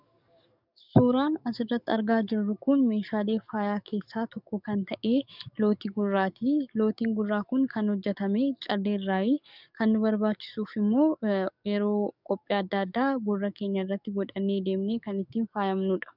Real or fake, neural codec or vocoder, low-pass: fake; codec, 44.1 kHz, 7.8 kbps, DAC; 5.4 kHz